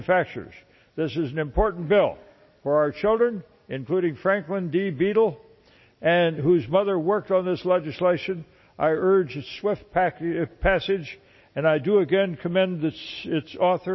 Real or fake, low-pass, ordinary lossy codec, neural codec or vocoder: real; 7.2 kHz; MP3, 24 kbps; none